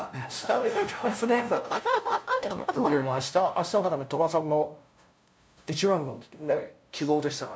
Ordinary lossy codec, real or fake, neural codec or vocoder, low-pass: none; fake; codec, 16 kHz, 0.5 kbps, FunCodec, trained on LibriTTS, 25 frames a second; none